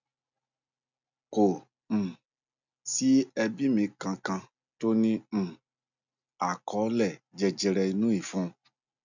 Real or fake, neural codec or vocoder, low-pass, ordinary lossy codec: real; none; 7.2 kHz; none